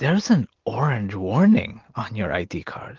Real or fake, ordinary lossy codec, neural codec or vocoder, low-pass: real; Opus, 16 kbps; none; 7.2 kHz